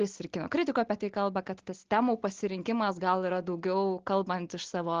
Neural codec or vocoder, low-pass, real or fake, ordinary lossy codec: none; 7.2 kHz; real; Opus, 16 kbps